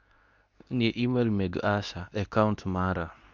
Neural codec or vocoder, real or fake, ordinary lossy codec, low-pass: codec, 24 kHz, 0.9 kbps, WavTokenizer, medium speech release version 2; fake; none; 7.2 kHz